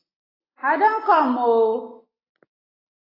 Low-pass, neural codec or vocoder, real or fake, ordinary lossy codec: 5.4 kHz; none; real; AAC, 24 kbps